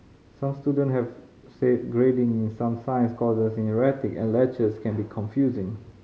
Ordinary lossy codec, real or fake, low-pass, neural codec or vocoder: none; real; none; none